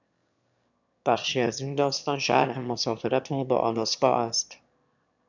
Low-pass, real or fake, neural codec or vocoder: 7.2 kHz; fake; autoencoder, 22.05 kHz, a latent of 192 numbers a frame, VITS, trained on one speaker